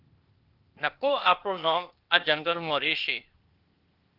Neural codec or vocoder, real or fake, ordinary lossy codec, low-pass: codec, 16 kHz, 0.8 kbps, ZipCodec; fake; Opus, 32 kbps; 5.4 kHz